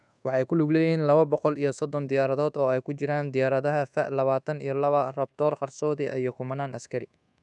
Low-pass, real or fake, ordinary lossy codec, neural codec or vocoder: 10.8 kHz; fake; none; codec, 24 kHz, 1.2 kbps, DualCodec